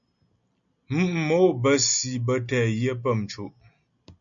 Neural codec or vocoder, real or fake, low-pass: none; real; 7.2 kHz